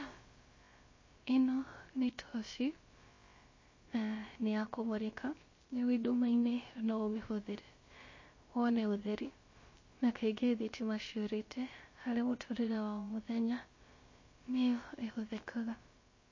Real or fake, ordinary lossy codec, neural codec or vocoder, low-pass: fake; MP3, 32 kbps; codec, 16 kHz, about 1 kbps, DyCAST, with the encoder's durations; 7.2 kHz